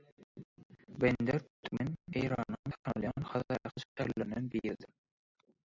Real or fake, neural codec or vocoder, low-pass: real; none; 7.2 kHz